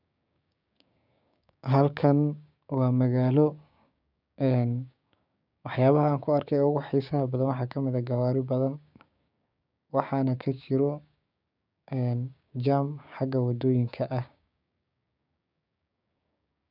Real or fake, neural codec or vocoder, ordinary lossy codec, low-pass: fake; codec, 16 kHz, 6 kbps, DAC; none; 5.4 kHz